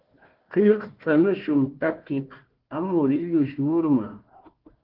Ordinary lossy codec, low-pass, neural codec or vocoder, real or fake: Opus, 16 kbps; 5.4 kHz; codec, 16 kHz, 1 kbps, FunCodec, trained on Chinese and English, 50 frames a second; fake